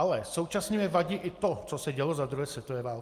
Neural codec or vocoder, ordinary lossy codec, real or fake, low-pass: none; Opus, 32 kbps; real; 14.4 kHz